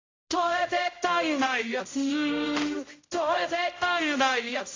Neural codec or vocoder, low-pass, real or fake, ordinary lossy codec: codec, 16 kHz, 0.5 kbps, X-Codec, HuBERT features, trained on general audio; 7.2 kHz; fake; AAC, 32 kbps